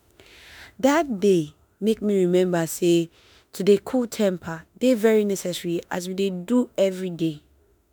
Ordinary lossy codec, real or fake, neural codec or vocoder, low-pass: none; fake; autoencoder, 48 kHz, 32 numbers a frame, DAC-VAE, trained on Japanese speech; none